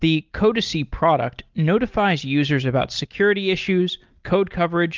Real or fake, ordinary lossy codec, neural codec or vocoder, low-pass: real; Opus, 32 kbps; none; 7.2 kHz